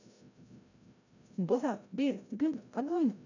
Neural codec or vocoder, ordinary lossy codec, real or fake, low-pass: codec, 16 kHz, 0.5 kbps, FreqCodec, larger model; none; fake; 7.2 kHz